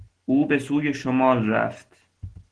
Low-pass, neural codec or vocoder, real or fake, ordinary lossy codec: 9.9 kHz; none; real; Opus, 16 kbps